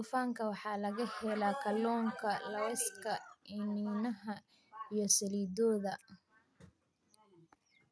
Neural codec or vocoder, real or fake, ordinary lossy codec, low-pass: none; real; none; none